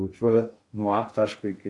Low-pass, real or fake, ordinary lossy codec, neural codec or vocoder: 10.8 kHz; fake; AAC, 48 kbps; codec, 16 kHz in and 24 kHz out, 0.6 kbps, FocalCodec, streaming, 4096 codes